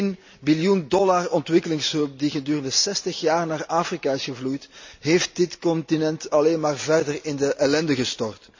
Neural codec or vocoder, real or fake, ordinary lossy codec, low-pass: none; real; MP3, 64 kbps; 7.2 kHz